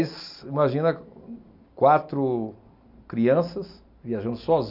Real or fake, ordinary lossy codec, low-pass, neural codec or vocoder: real; none; 5.4 kHz; none